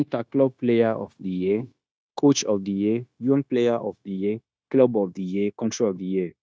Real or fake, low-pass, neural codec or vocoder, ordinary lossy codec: fake; none; codec, 16 kHz, 0.9 kbps, LongCat-Audio-Codec; none